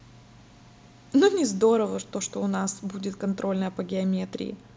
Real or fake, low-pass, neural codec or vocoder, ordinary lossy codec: real; none; none; none